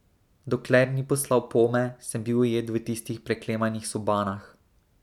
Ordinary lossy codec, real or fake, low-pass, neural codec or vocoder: none; real; 19.8 kHz; none